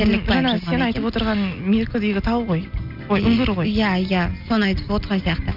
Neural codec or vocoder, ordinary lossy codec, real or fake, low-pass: none; none; real; 5.4 kHz